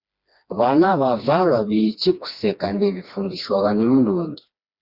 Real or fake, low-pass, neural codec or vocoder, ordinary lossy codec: fake; 5.4 kHz; codec, 16 kHz, 2 kbps, FreqCodec, smaller model; Opus, 64 kbps